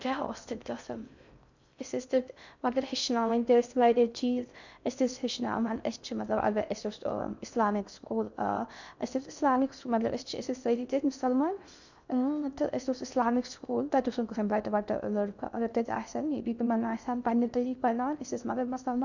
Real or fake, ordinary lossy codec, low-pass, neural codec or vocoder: fake; none; 7.2 kHz; codec, 16 kHz in and 24 kHz out, 0.6 kbps, FocalCodec, streaming, 2048 codes